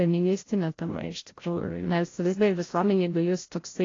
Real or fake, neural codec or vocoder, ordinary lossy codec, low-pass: fake; codec, 16 kHz, 0.5 kbps, FreqCodec, larger model; AAC, 32 kbps; 7.2 kHz